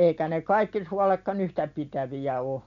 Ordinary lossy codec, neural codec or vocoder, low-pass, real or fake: none; none; 7.2 kHz; real